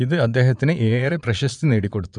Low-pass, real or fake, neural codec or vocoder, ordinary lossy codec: 9.9 kHz; fake; vocoder, 22.05 kHz, 80 mel bands, Vocos; none